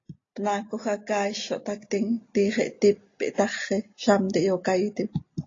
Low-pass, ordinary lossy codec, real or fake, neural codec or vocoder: 7.2 kHz; AAC, 32 kbps; real; none